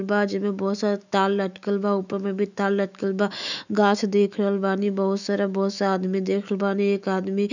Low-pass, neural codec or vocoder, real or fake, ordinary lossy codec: 7.2 kHz; autoencoder, 48 kHz, 128 numbers a frame, DAC-VAE, trained on Japanese speech; fake; none